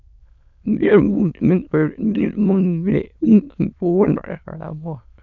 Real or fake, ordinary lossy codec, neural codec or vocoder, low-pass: fake; none; autoencoder, 22.05 kHz, a latent of 192 numbers a frame, VITS, trained on many speakers; 7.2 kHz